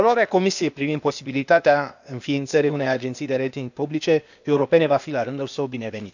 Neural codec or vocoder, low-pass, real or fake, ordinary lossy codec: codec, 16 kHz, 0.8 kbps, ZipCodec; 7.2 kHz; fake; none